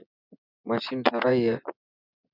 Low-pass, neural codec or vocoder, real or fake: 5.4 kHz; vocoder, 24 kHz, 100 mel bands, Vocos; fake